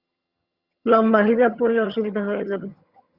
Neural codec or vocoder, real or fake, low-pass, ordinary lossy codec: vocoder, 22.05 kHz, 80 mel bands, HiFi-GAN; fake; 5.4 kHz; Opus, 64 kbps